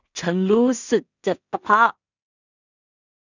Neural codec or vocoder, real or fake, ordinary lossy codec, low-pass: codec, 16 kHz in and 24 kHz out, 0.4 kbps, LongCat-Audio-Codec, two codebook decoder; fake; none; 7.2 kHz